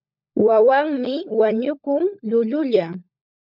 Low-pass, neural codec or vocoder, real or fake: 5.4 kHz; codec, 16 kHz, 16 kbps, FunCodec, trained on LibriTTS, 50 frames a second; fake